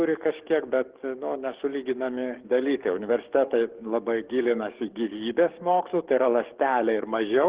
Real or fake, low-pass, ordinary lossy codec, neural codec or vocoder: fake; 3.6 kHz; Opus, 16 kbps; codec, 44.1 kHz, 7.8 kbps, DAC